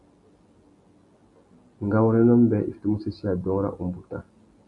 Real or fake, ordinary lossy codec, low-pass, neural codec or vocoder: real; MP3, 64 kbps; 10.8 kHz; none